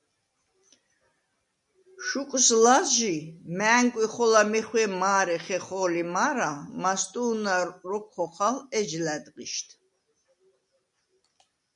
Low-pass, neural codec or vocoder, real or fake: 10.8 kHz; none; real